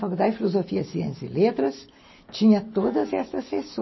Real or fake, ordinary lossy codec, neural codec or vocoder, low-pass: real; MP3, 24 kbps; none; 7.2 kHz